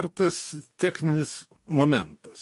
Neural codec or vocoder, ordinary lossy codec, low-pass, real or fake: codec, 44.1 kHz, 2.6 kbps, DAC; MP3, 48 kbps; 14.4 kHz; fake